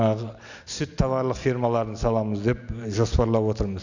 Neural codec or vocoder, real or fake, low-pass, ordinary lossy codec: none; real; 7.2 kHz; none